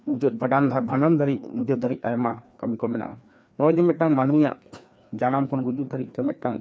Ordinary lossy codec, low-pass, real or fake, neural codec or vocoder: none; none; fake; codec, 16 kHz, 2 kbps, FreqCodec, larger model